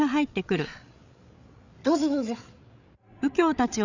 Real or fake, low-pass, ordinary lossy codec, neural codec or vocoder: fake; 7.2 kHz; none; codec, 16 kHz, 8 kbps, FreqCodec, larger model